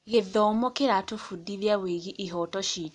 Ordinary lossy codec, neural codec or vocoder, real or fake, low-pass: none; none; real; 10.8 kHz